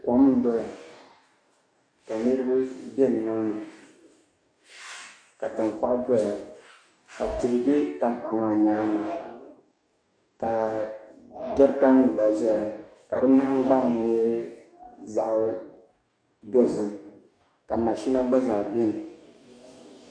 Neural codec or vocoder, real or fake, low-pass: codec, 44.1 kHz, 2.6 kbps, DAC; fake; 9.9 kHz